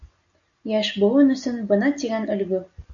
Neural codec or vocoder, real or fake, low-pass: none; real; 7.2 kHz